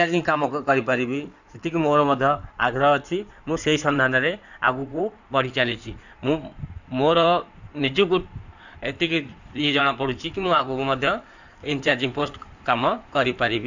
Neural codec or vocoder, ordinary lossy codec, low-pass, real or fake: codec, 16 kHz in and 24 kHz out, 2.2 kbps, FireRedTTS-2 codec; none; 7.2 kHz; fake